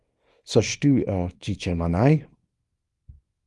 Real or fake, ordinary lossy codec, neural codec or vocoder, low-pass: fake; Opus, 32 kbps; codec, 24 kHz, 0.9 kbps, WavTokenizer, small release; 10.8 kHz